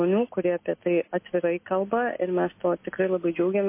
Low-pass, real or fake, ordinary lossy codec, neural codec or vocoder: 3.6 kHz; real; MP3, 24 kbps; none